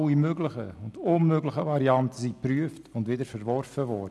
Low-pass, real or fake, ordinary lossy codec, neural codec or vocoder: none; real; none; none